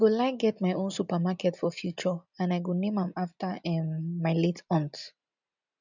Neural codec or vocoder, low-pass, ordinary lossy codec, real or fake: none; 7.2 kHz; none; real